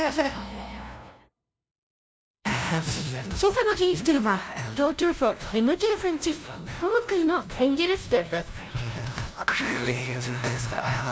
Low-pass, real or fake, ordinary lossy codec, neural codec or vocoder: none; fake; none; codec, 16 kHz, 0.5 kbps, FunCodec, trained on LibriTTS, 25 frames a second